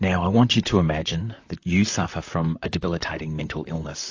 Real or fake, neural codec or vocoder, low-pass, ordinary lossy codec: fake; vocoder, 44.1 kHz, 128 mel bands, Pupu-Vocoder; 7.2 kHz; AAC, 48 kbps